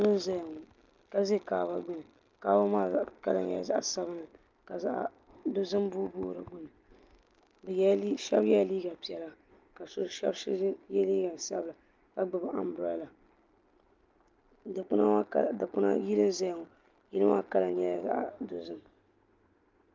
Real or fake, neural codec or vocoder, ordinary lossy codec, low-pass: real; none; Opus, 32 kbps; 7.2 kHz